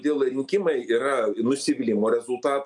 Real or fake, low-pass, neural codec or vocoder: real; 10.8 kHz; none